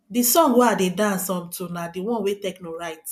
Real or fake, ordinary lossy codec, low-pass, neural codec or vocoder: real; none; 14.4 kHz; none